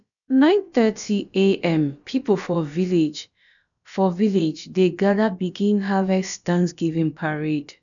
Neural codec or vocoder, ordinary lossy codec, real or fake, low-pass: codec, 16 kHz, about 1 kbps, DyCAST, with the encoder's durations; none; fake; 7.2 kHz